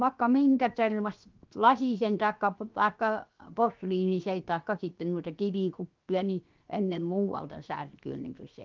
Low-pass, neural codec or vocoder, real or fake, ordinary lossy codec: 7.2 kHz; codec, 16 kHz, 0.7 kbps, FocalCodec; fake; Opus, 32 kbps